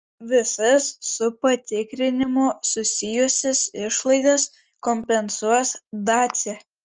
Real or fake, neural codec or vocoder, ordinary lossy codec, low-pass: real; none; Opus, 24 kbps; 7.2 kHz